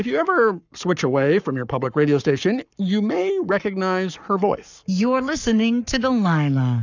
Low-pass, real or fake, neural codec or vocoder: 7.2 kHz; fake; codec, 44.1 kHz, 7.8 kbps, Pupu-Codec